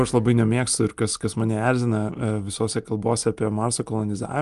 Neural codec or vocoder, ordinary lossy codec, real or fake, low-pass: none; Opus, 32 kbps; real; 10.8 kHz